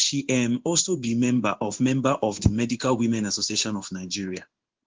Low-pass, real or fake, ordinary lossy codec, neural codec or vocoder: 7.2 kHz; fake; Opus, 16 kbps; codec, 16 kHz in and 24 kHz out, 1 kbps, XY-Tokenizer